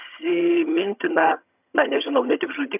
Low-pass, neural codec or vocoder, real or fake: 3.6 kHz; vocoder, 22.05 kHz, 80 mel bands, HiFi-GAN; fake